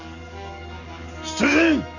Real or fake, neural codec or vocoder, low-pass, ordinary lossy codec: fake; codec, 44.1 kHz, 7.8 kbps, DAC; 7.2 kHz; none